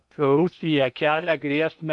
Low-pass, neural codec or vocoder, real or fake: 10.8 kHz; codec, 16 kHz in and 24 kHz out, 0.8 kbps, FocalCodec, streaming, 65536 codes; fake